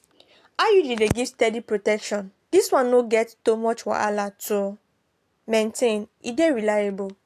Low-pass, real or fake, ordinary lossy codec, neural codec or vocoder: 14.4 kHz; real; AAC, 64 kbps; none